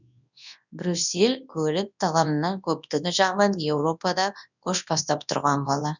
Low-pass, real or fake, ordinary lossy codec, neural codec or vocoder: 7.2 kHz; fake; none; codec, 24 kHz, 0.9 kbps, WavTokenizer, large speech release